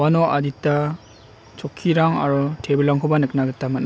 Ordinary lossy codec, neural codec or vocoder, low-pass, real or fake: none; none; none; real